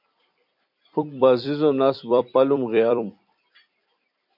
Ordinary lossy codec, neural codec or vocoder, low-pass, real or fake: MP3, 48 kbps; vocoder, 44.1 kHz, 128 mel bands every 256 samples, BigVGAN v2; 5.4 kHz; fake